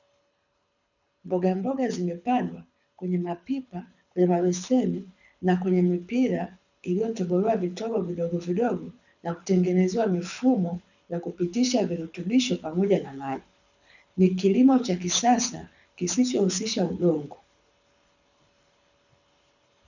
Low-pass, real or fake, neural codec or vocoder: 7.2 kHz; fake; codec, 24 kHz, 6 kbps, HILCodec